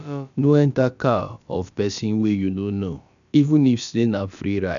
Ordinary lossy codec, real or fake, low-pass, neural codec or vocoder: none; fake; 7.2 kHz; codec, 16 kHz, about 1 kbps, DyCAST, with the encoder's durations